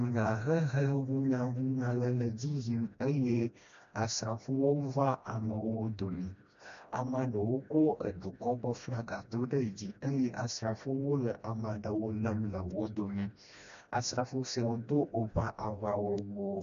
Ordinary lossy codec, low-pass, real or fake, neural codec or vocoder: MP3, 64 kbps; 7.2 kHz; fake; codec, 16 kHz, 1 kbps, FreqCodec, smaller model